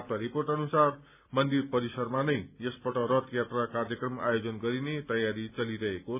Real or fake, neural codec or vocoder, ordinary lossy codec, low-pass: real; none; none; 3.6 kHz